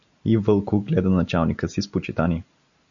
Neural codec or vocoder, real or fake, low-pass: none; real; 7.2 kHz